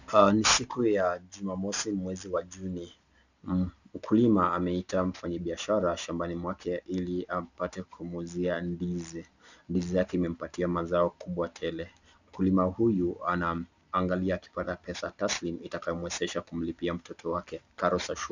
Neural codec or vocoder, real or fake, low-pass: none; real; 7.2 kHz